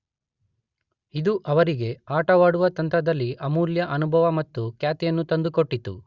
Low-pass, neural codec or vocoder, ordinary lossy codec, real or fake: 7.2 kHz; none; Opus, 64 kbps; real